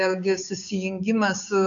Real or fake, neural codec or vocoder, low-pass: fake; codec, 16 kHz, 6 kbps, DAC; 7.2 kHz